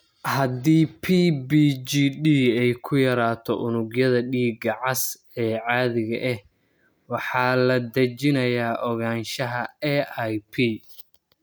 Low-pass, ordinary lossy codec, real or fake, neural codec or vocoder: none; none; real; none